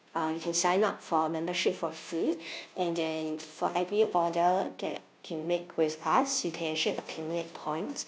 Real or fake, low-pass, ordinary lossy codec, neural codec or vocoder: fake; none; none; codec, 16 kHz, 0.5 kbps, FunCodec, trained on Chinese and English, 25 frames a second